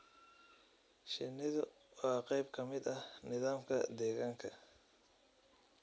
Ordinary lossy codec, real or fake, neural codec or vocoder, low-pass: none; real; none; none